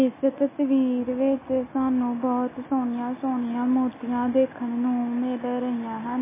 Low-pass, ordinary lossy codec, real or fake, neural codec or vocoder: 3.6 kHz; MP3, 16 kbps; real; none